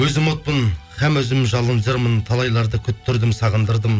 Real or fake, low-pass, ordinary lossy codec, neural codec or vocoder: real; none; none; none